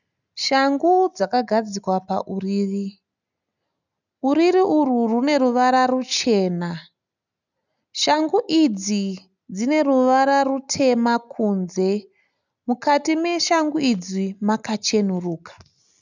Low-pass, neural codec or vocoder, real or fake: 7.2 kHz; none; real